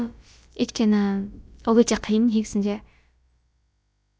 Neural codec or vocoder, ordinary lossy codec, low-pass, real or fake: codec, 16 kHz, about 1 kbps, DyCAST, with the encoder's durations; none; none; fake